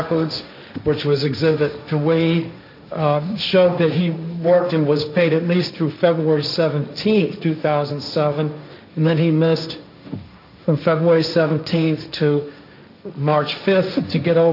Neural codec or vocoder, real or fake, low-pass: codec, 16 kHz, 1.1 kbps, Voila-Tokenizer; fake; 5.4 kHz